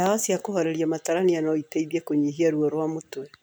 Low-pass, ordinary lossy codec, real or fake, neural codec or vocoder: none; none; real; none